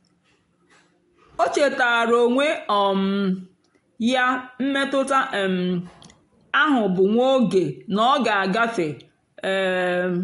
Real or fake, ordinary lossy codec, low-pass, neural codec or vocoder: real; AAC, 48 kbps; 10.8 kHz; none